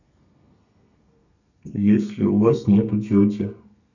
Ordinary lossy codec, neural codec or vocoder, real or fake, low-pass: none; codec, 32 kHz, 1.9 kbps, SNAC; fake; 7.2 kHz